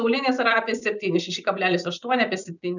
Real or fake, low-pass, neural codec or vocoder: real; 7.2 kHz; none